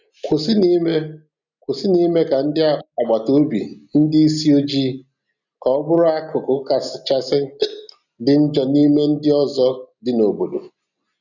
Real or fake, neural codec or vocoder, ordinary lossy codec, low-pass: real; none; none; 7.2 kHz